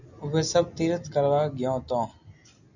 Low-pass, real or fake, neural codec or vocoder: 7.2 kHz; real; none